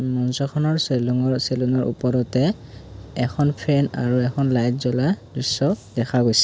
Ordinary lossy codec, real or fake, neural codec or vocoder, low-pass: none; real; none; none